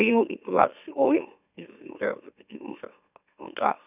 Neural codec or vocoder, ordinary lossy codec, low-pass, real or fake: autoencoder, 44.1 kHz, a latent of 192 numbers a frame, MeloTTS; none; 3.6 kHz; fake